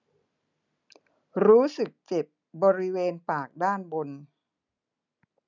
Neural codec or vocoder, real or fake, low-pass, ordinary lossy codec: none; real; 7.2 kHz; none